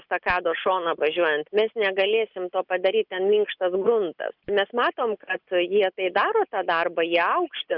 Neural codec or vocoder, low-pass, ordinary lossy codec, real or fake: none; 5.4 kHz; Opus, 64 kbps; real